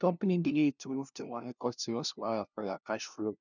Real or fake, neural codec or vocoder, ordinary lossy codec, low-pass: fake; codec, 16 kHz, 0.5 kbps, FunCodec, trained on LibriTTS, 25 frames a second; none; 7.2 kHz